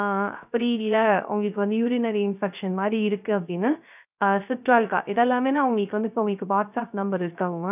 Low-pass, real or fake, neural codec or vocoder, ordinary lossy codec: 3.6 kHz; fake; codec, 16 kHz, 0.3 kbps, FocalCodec; none